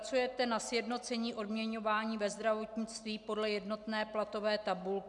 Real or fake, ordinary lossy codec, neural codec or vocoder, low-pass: real; MP3, 96 kbps; none; 10.8 kHz